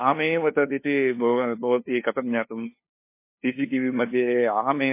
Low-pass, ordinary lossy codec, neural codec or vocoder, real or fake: 3.6 kHz; MP3, 24 kbps; codec, 16 kHz, 2 kbps, FunCodec, trained on LibriTTS, 25 frames a second; fake